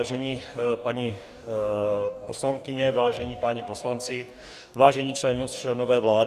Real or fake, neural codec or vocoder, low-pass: fake; codec, 44.1 kHz, 2.6 kbps, DAC; 14.4 kHz